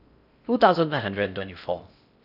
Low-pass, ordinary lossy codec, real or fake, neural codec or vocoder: 5.4 kHz; none; fake; codec, 16 kHz in and 24 kHz out, 0.6 kbps, FocalCodec, streaming, 4096 codes